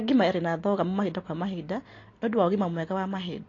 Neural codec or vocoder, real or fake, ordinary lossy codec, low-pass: none; real; AAC, 32 kbps; 7.2 kHz